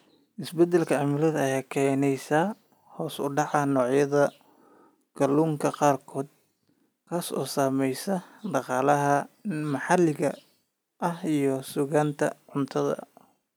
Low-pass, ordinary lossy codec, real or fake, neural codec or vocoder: none; none; real; none